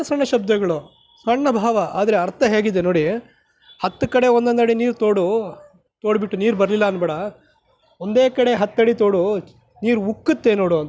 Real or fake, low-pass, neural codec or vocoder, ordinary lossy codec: real; none; none; none